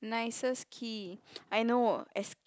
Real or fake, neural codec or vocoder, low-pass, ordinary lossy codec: real; none; none; none